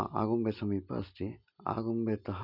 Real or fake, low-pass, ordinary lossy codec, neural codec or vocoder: real; 5.4 kHz; none; none